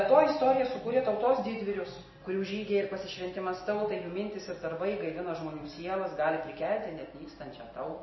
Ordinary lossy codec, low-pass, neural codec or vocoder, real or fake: MP3, 24 kbps; 7.2 kHz; none; real